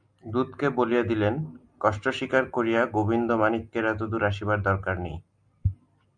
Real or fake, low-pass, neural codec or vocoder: real; 9.9 kHz; none